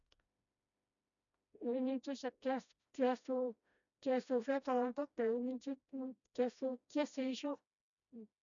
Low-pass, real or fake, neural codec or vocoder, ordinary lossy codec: 7.2 kHz; fake; codec, 16 kHz, 1 kbps, FreqCodec, smaller model; none